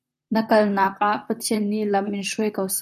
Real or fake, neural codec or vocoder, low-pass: fake; vocoder, 44.1 kHz, 128 mel bands, Pupu-Vocoder; 14.4 kHz